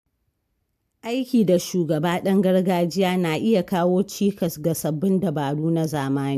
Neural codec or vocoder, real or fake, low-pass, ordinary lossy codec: none; real; 14.4 kHz; AAC, 96 kbps